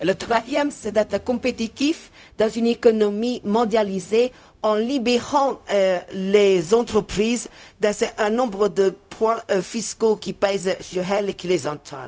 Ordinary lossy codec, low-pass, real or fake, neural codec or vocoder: none; none; fake; codec, 16 kHz, 0.4 kbps, LongCat-Audio-Codec